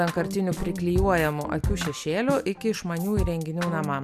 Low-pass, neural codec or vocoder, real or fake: 14.4 kHz; none; real